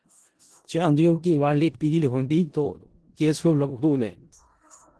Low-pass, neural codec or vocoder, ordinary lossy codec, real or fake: 10.8 kHz; codec, 16 kHz in and 24 kHz out, 0.4 kbps, LongCat-Audio-Codec, four codebook decoder; Opus, 16 kbps; fake